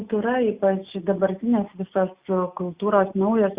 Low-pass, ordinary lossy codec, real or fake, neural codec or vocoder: 3.6 kHz; Opus, 64 kbps; real; none